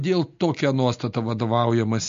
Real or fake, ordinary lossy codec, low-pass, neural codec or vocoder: real; MP3, 48 kbps; 7.2 kHz; none